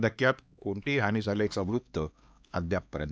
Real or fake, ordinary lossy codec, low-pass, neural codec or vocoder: fake; none; none; codec, 16 kHz, 2 kbps, X-Codec, HuBERT features, trained on LibriSpeech